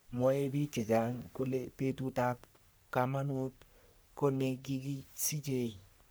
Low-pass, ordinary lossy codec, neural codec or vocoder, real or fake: none; none; codec, 44.1 kHz, 3.4 kbps, Pupu-Codec; fake